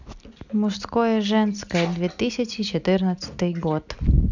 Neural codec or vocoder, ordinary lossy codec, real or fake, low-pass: none; none; real; 7.2 kHz